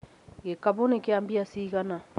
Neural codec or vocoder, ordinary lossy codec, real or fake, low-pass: none; none; real; 10.8 kHz